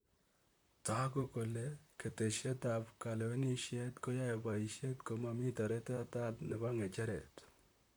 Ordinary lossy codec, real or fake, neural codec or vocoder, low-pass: none; fake; vocoder, 44.1 kHz, 128 mel bands, Pupu-Vocoder; none